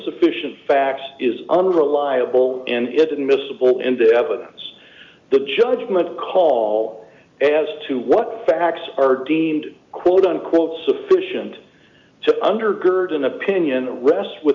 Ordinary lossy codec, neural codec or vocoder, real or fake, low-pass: MP3, 64 kbps; none; real; 7.2 kHz